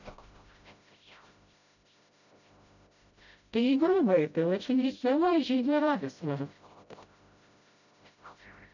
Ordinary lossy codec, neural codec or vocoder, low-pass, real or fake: none; codec, 16 kHz, 0.5 kbps, FreqCodec, smaller model; 7.2 kHz; fake